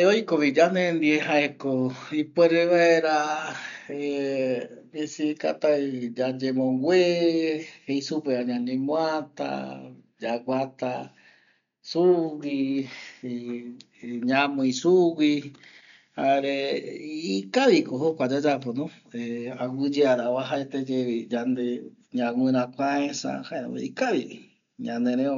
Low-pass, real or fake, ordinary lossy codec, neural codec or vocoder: 7.2 kHz; real; none; none